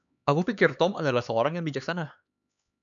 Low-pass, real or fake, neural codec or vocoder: 7.2 kHz; fake; codec, 16 kHz, 4 kbps, X-Codec, HuBERT features, trained on LibriSpeech